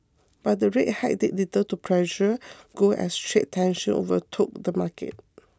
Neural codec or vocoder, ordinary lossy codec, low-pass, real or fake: none; none; none; real